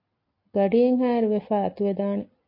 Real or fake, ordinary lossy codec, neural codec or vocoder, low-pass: real; MP3, 32 kbps; none; 5.4 kHz